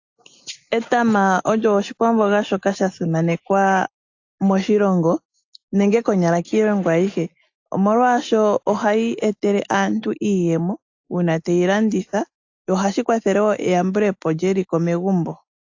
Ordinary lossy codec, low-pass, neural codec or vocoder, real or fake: AAC, 48 kbps; 7.2 kHz; none; real